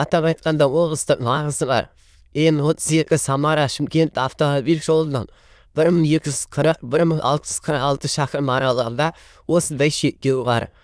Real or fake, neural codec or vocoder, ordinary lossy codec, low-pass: fake; autoencoder, 22.05 kHz, a latent of 192 numbers a frame, VITS, trained on many speakers; none; none